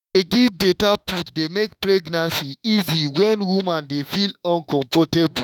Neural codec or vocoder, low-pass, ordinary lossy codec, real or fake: autoencoder, 48 kHz, 32 numbers a frame, DAC-VAE, trained on Japanese speech; 19.8 kHz; none; fake